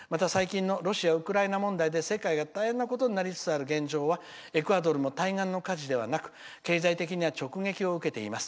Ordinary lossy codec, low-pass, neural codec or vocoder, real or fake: none; none; none; real